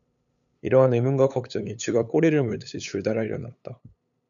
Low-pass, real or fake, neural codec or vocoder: 7.2 kHz; fake; codec, 16 kHz, 8 kbps, FunCodec, trained on LibriTTS, 25 frames a second